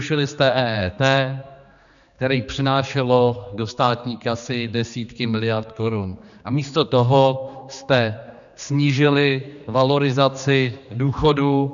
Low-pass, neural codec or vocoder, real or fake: 7.2 kHz; codec, 16 kHz, 2 kbps, X-Codec, HuBERT features, trained on general audio; fake